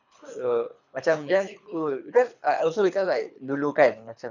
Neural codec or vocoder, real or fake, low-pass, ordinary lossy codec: codec, 24 kHz, 3 kbps, HILCodec; fake; 7.2 kHz; none